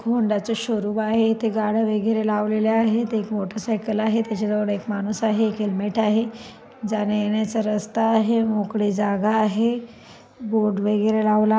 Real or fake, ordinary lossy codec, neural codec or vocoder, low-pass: real; none; none; none